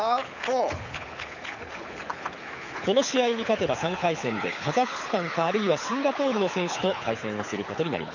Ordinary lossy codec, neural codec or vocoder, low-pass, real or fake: none; codec, 24 kHz, 6 kbps, HILCodec; 7.2 kHz; fake